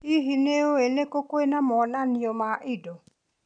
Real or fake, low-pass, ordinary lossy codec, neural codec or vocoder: real; 9.9 kHz; none; none